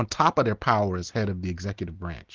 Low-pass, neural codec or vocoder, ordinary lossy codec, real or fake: 7.2 kHz; none; Opus, 16 kbps; real